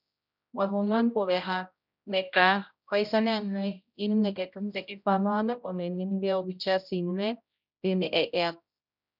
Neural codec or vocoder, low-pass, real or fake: codec, 16 kHz, 0.5 kbps, X-Codec, HuBERT features, trained on general audio; 5.4 kHz; fake